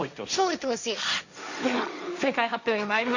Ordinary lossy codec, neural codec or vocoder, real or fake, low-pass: none; codec, 16 kHz, 1.1 kbps, Voila-Tokenizer; fake; 7.2 kHz